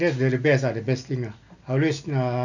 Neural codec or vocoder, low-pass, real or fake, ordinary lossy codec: none; 7.2 kHz; real; none